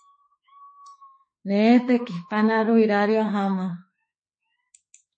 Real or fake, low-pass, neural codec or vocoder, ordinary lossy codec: fake; 9.9 kHz; autoencoder, 48 kHz, 32 numbers a frame, DAC-VAE, trained on Japanese speech; MP3, 32 kbps